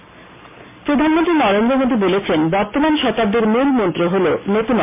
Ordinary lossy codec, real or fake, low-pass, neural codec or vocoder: MP3, 24 kbps; real; 3.6 kHz; none